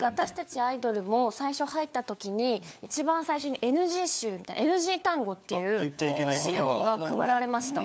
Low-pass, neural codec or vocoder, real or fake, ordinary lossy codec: none; codec, 16 kHz, 4 kbps, FunCodec, trained on LibriTTS, 50 frames a second; fake; none